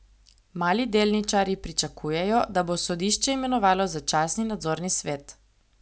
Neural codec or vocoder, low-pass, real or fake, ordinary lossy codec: none; none; real; none